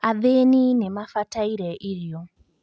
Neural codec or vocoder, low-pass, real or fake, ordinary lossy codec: none; none; real; none